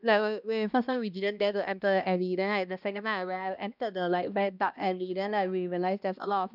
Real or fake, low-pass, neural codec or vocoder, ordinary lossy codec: fake; 5.4 kHz; codec, 16 kHz, 1 kbps, X-Codec, HuBERT features, trained on balanced general audio; none